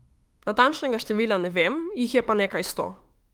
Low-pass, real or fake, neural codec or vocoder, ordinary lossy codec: 19.8 kHz; fake; autoencoder, 48 kHz, 32 numbers a frame, DAC-VAE, trained on Japanese speech; Opus, 24 kbps